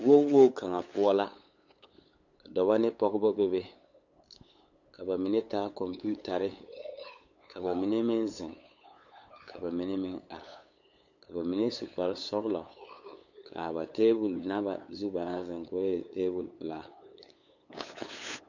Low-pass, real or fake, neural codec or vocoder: 7.2 kHz; fake; codec, 16 kHz, 8 kbps, FunCodec, trained on LibriTTS, 25 frames a second